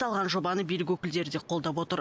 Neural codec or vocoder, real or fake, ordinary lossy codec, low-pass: none; real; none; none